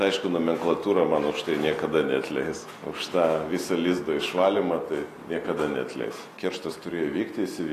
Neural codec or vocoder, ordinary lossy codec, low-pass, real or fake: none; AAC, 48 kbps; 14.4 kHz; real